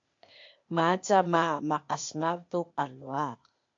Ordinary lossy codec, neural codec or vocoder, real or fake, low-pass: MP3, 48 kbps; codec, 16 kHz, 0.8 kbps, ZipCodec; fake; 7.2 kHz